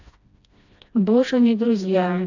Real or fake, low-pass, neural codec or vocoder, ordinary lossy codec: fake; 7.2 kHz; codec, 16 kHz, 1 kbps, FreqCodec, smaller model; AAC, 48 kbps